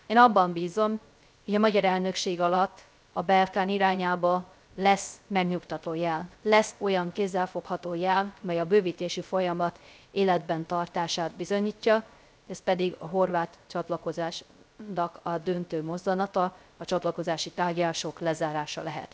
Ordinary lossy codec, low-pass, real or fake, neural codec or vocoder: none; none; fake; codec, 16 kHz, 0.3 kbps, FocalCodec